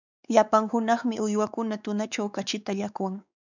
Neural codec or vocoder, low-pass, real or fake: codec, 16 kHz, 4 kbps, X-Codec, WavLM features, trained on Multilingual LibriSpeech; 7.2 kHz; fake